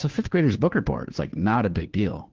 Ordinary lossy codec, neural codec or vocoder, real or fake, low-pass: Opus, 16 kbps; codec, 16 kHz, 2 kbps, FunCodec, trained on Chinese and English, 25 frames a second; fake; 7.2 kHz